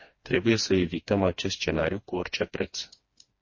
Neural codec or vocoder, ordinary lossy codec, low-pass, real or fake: codec, 16 kHz, 2 kbps, FreqCodec, smaller model; MP3, 32 kbps; 7.2 kHz; fake